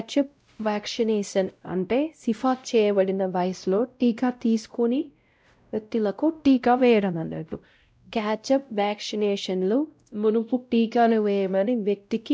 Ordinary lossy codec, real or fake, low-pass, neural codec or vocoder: none; fake; none; codec, 16 kHz, 0.5 kbps, X-Codec, WavLM features, trained on Multilingual LibriSpeech